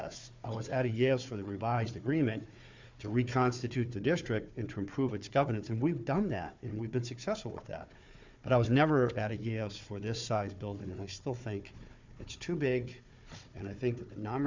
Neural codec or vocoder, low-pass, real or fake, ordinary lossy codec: codec, 16 kHz, 4 kbps, FunCodec, trained on Chinese and English, 50 frames a second; 7.2 kHz; fake; MP3, 64 kbps